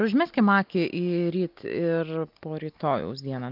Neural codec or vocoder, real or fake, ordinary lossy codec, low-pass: none; real; Opus, 24 kbps; 5.4 kHz